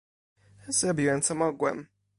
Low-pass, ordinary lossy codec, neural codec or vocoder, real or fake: 10.8 kHz; MP3, 48 kbps; none; real